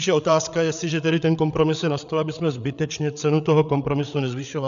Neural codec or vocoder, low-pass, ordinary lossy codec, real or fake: codec, 16 kHz, 8 kbps, FreqCodec, larger model; 7.2 kHz; AAC, 96 kbps; fake